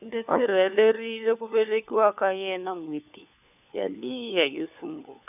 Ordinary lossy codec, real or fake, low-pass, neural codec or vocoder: none; fake; 3.6 kHz; codec, 16 kHz, 4 kbps, FunCodec, trained on LibriTTS, 50 frames a second